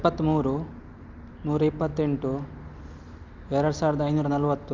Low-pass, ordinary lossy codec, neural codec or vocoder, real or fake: 7.2 kHz; Opus, 24 kbps; none; real